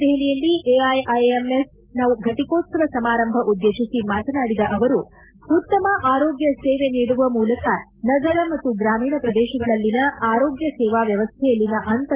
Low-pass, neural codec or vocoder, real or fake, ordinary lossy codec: 3.6 kHz; none; real; Opus, 32 kbps